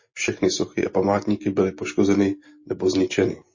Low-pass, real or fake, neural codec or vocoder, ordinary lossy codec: 7.2 kHz; real; none; MP3, 32 kbps